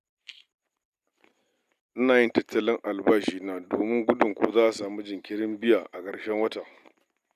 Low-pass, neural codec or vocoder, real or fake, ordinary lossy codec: 14.4 kHz; none; real; none